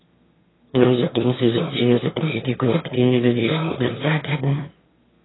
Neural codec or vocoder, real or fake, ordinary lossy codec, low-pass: autoencoder, 22.05 kHz, a latent of 192 numbers a frame, VITS, trained on one speaker; fake; AAC, 16 kbps; 7.2 kHz